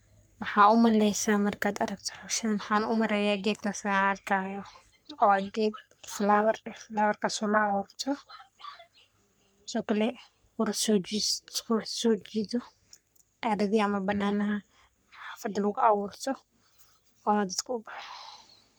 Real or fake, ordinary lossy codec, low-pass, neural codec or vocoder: fake; none; none; codec, 44.1 kHz, 3.4 kbps, Pupu-Codec